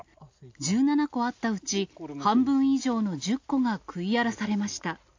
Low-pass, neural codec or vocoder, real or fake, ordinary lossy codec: 7.2 kHz; none; real; AAC, 48 kbps